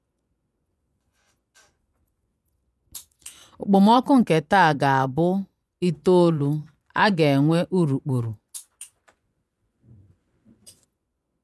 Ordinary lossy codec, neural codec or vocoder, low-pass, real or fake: none; vocoder, 24 kHz, 100 mel bands, Vocos; none; fake